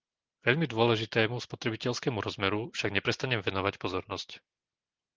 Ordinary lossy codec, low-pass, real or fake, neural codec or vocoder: Opus, 16 kbps; 7.2 kHz; real; none